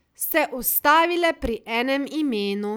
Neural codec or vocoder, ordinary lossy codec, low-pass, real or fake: none; none; none; real